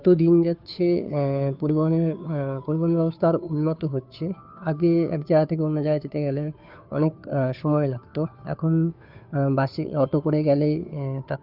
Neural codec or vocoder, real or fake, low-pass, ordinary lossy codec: codec, 16 kHz, 2 kbps, FunCodec, trained on Chinese and English, 25 frames a second; fake; 5.4 kHz; none